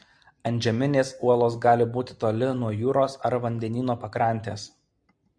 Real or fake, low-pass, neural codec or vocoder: real; 9.9 kHz; none